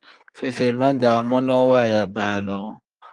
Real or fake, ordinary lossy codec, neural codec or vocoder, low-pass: fake; Opus, 32 kbps; codec, 24 kHz, 1 kbps, SNAC; 10.8 kHz